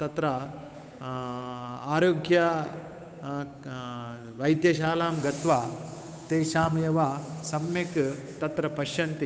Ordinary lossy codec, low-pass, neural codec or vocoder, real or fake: none; none; codec, 16 kHz, 8 kbps, FunCodec, trained on Chinese and English, 25 frames a second; fake